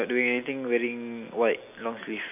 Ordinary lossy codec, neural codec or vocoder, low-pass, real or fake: none; none; 3.6 kHz; real